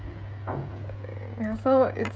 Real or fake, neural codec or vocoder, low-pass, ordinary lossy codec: fake; codec, 16 kHz, 16 kbps, FreqCodec, smaller model; none; none